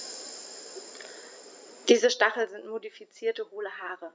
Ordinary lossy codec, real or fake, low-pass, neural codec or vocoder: none; real; none; none